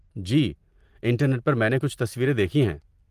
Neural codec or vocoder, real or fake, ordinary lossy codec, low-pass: none; real; Opus, 24 kbps; 14.4 kHz